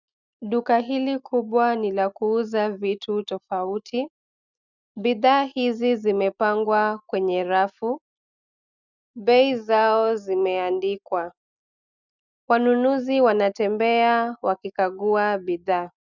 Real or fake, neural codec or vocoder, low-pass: real; none; 7.2 kHz